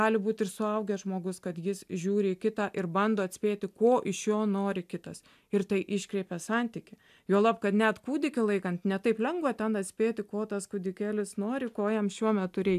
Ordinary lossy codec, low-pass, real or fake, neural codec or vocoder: AAC, 96 kbps; 14.4 kHz; real; none